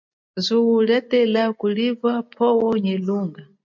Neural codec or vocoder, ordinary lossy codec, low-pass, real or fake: none; MP3, 48 kbps; 7.2 kHz; real